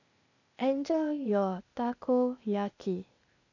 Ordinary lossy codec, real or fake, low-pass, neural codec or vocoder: none; fake; 7.2 kHz; codec, 16 kHz, 0.8 kbps, ZipCodec